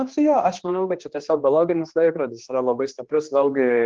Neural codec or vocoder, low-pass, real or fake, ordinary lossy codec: codec, 16 kHz, 2 kbps, X-Codec, HuBERT features, trained on general audio; 7.2 kHz; fake; Opus, 16 kbps